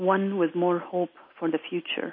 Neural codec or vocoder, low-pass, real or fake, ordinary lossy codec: none; 5.4 kHz; real; MP3, 24 kbps